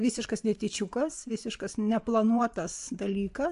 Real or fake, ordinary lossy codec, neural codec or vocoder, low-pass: fake; AAC, 64 kbps; vocoder, 24 kHz, 100 mel bands, Vocos; 10.8 kHz